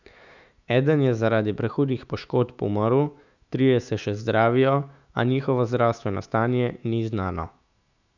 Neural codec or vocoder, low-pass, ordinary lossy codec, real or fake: autoencoder, 48 kHz, 128 numbers a frame, DAC-VAE, trained on Japanese speech; 7.2 kHz; none; fake